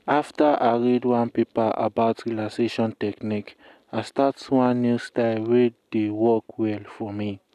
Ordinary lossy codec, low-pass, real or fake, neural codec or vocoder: none; 14.4 kHz; real; none